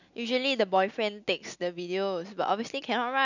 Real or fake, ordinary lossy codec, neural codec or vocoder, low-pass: real; none; none; 7.2 kHz